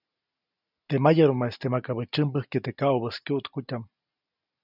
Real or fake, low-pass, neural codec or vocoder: real; 5.4 kHz; none